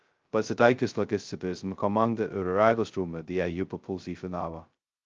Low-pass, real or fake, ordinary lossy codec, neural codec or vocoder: 7.2 kHz; fake; Opus, 24 kbps; codec, 16 kHz, 0.2 kbps, FocalCodec